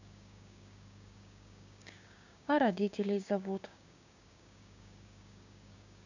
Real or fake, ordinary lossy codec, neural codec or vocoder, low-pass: fake; none; codec, 16 kHz, 6 kbps, DAC; 7.2 kHz